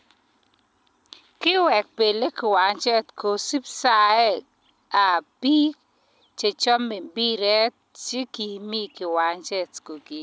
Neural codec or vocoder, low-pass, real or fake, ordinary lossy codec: none; none; real; none